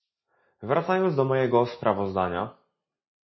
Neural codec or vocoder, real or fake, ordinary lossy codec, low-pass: none; real; MP3, 24 kbps; 7.2 kHz